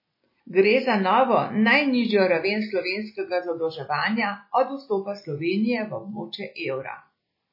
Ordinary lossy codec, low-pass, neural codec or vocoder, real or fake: MP3, 24 kbps; 5.4 kHz; none; real